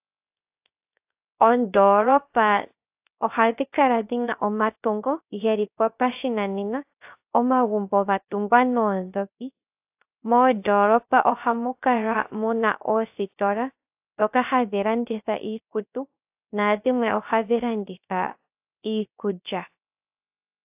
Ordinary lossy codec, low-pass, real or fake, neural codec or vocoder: AAC, 32 kbps; 3.6 kHz; fake; codec, 16 kHz, 0.3 kbps, FocalCodec